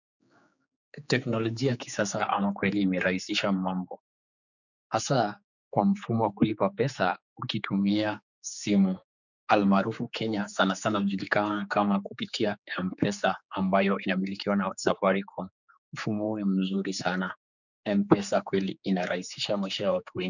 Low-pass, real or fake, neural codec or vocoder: 7.2 kHz; fake; codec, 16 kHz, 4 kbps, X-Codec, HuBERT features, trained on general audio